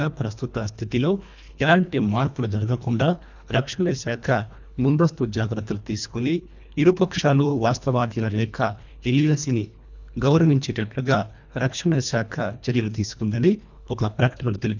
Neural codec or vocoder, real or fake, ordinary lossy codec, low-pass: codec, 24 kHz, 1.5 kbps, HILCodec; fake; none; 7.2 kHz